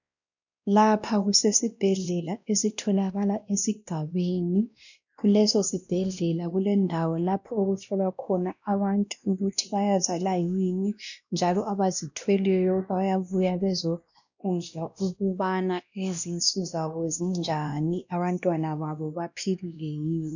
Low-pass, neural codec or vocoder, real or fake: 7.2 kHz; codec, 16 kHz, 1 kbps, X-Codec, WavLM features, trained on Multilingual LibriSpeech; fake